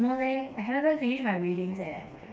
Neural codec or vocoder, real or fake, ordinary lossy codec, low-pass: codec, 16 kHz, 2 kbps, FreqCodec, smaller model; fake; none; none